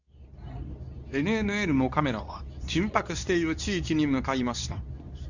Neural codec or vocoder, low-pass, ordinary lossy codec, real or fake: codec, 24 kHz, 0.9 kbps, WavTokenizer, medium speech release version 2; 7.2 kHz; none; fake